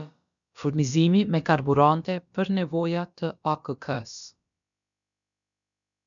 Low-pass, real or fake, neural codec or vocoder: 7.2 kHz; fake; codec, 16 kHz, about 1 kbps, DyCAST, with the encoder's durations